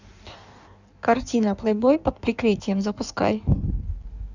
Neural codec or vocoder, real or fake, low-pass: codec, 16 kHz in and 24 kHz out, 1.1 kbps, FireRedTTS-2 codec; fake; 7.2 kHz